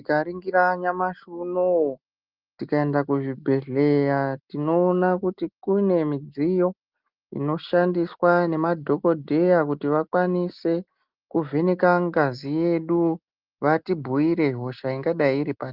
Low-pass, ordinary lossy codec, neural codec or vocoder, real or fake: 5.4 kHz; Opus, 32 kbps; none; real